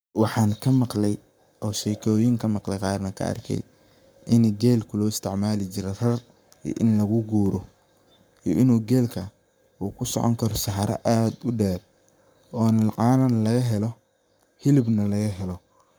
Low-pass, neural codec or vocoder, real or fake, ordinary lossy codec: none; codec, 44.1 kHz, 7.8 kbps, Pupu-Codec; fake; none